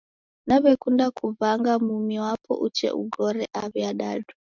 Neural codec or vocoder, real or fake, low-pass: none; real; 7.2 kHz